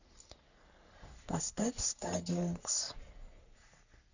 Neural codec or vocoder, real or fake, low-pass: codec, 44.1 kHz, 3.4 kbps, Pupu-Codec; fake; 7.2 kHz